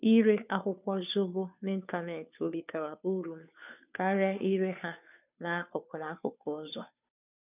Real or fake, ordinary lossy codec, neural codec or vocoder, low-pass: fake; none; codec, 16 kHz, 2 kbps, FunCodec, trained on Chinese and English, 25 frames a second; 3.6 kHz